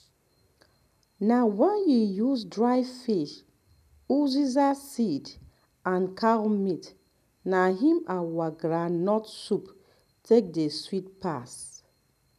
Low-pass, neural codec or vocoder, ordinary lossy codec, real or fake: 14.4 kHz; none; none; real